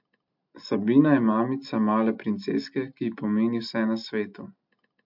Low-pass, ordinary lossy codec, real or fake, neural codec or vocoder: 5.4 kHz; MP3, 48 kbps; real; none